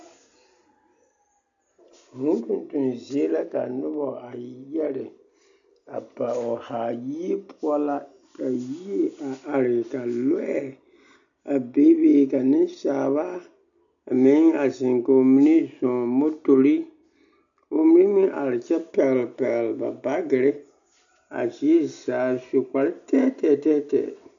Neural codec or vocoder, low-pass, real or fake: none; 7.2 kHz; real